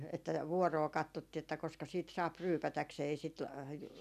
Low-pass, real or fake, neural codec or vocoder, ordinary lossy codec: 14.4 kHz; real; none; none